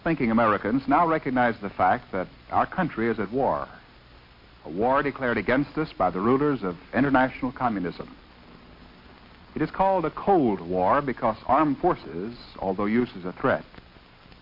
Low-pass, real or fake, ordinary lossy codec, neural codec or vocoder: 5.4 kHz; real; MP3, 32 kbps; none